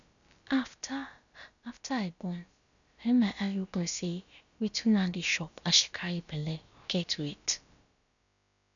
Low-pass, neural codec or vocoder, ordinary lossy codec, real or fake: 7.2 kHz; codec, 16 kHz, about 1 kbps, DyCAST, with the encoder's durations; none; fake